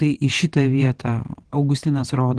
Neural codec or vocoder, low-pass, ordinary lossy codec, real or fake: vocoder, 22.05 kHz, 80 mel bands, WaveNeXt; 9.9 kHz; Opus, 16 kbps; fake